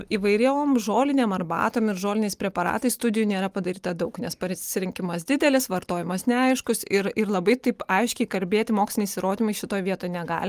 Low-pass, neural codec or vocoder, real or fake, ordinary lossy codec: 14.4 kHz; none; real; Opus, 32 kbps